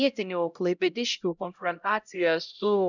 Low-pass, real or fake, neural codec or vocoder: 7.2 kHz; fake; codec, 16 kHz, 0.5 kbps, X-Codec, HuBERT features, trained on LibriSpeech